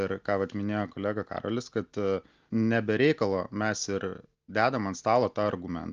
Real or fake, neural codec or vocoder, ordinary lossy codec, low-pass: real; none; Opus, 24 kbps; 7.2 kHz